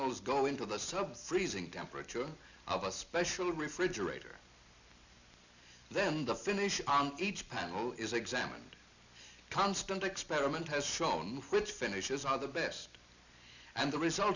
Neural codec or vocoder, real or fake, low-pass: none; real; 7.2 kHz